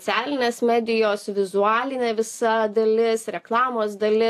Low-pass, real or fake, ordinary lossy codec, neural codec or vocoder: 14.4 kHz; real; AAC, 64 kbps; none